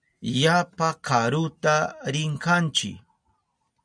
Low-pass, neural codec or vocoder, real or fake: 9.9 kHz; none; real